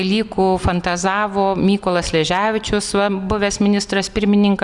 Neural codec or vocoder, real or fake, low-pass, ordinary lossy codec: none; real; 10.8 kHz; Opus, 64 kbps